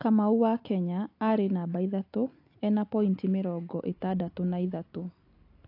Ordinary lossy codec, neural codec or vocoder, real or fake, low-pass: AAC, 32 kbps; none; real; 5.4 kHz